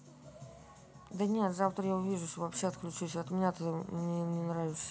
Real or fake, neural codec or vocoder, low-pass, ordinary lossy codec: real; none; none; none